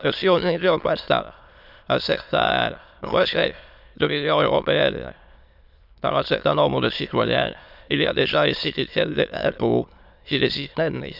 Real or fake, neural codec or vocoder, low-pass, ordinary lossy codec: fake; autoencoder, 22.05 kHz, a latent of 192 numbers a frame, VITS, trained on many speakers; 5.4 kHz; none